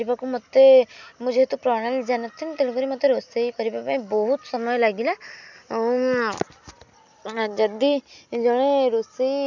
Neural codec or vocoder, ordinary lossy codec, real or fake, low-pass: none; none; real; 7.2 kHz